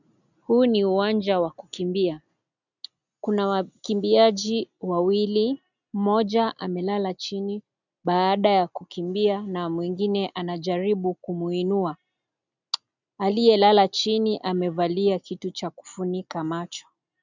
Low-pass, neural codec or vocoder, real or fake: 7.2 kHz; none; real